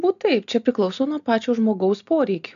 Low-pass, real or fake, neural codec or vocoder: 7.2 kHz; real; none